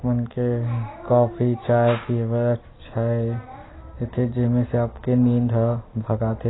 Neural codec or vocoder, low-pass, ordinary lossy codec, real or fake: none; 7.2 kHz; AAC, 16 kbps; real